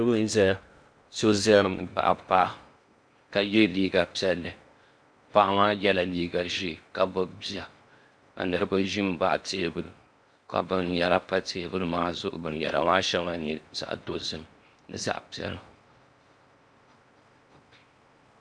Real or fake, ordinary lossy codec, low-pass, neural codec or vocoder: fake; MP3, 96 kbps; 9.9 kHz; codec, 16 kHz in and 24 kHz out, 0.6 kbps, FocalCodec, streaming, 2048 codes